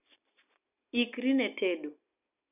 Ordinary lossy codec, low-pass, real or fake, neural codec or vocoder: none; 3.6 kHz; real; none